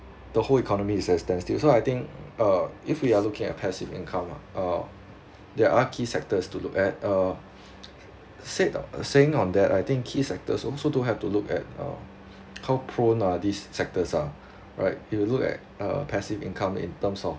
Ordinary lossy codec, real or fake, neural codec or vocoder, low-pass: none; real; none; none